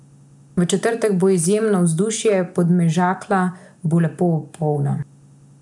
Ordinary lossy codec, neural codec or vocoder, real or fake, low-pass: none; none; real; 10.8 kHz